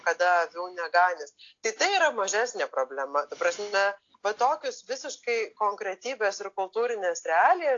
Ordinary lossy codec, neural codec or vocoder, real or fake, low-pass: AAC, 48 kbps; none; real; 7.2 kHz